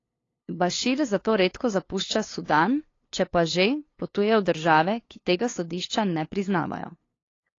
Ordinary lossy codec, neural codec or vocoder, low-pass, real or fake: AAC, 32 kbps; codec, 16 kHz, 2 kbps, FunCodec, trained on LibriTTS, 25 frames a second; 7.2 kHz; fake